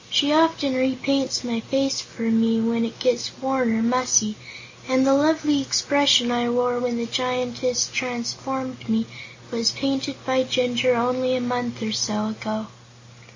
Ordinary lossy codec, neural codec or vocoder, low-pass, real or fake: MP3, 64 kbps; none; 7.2 kHz; real